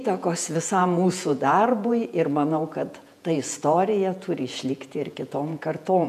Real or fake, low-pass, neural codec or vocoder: fake; 14.4 kHz; vocoder, 48 kHz, 128 mel bands, Vocos